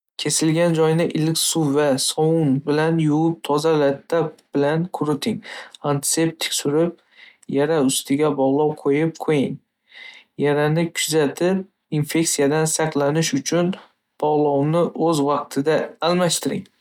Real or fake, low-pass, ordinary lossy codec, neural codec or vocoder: real; 19.8 kHz; none; none